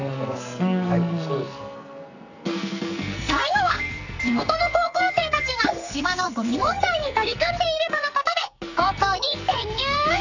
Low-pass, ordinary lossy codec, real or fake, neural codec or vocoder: 7.2 kHz; none; fake; codec, 44.1 kHz, 2.6 kbps, SNAC